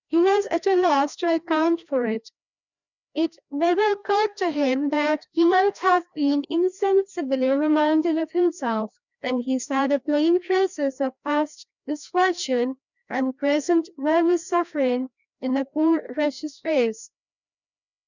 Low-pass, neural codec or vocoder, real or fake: 7.2 kHz; codec, 16 kHz, 1 kbps, FreqCodec, larger model; fake